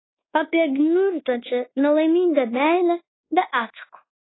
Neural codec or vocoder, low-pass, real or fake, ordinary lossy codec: codec, 24 kHz, 1.2 kbps, DualCodec; 7.2 kHz; fake; AAC, 16 kbps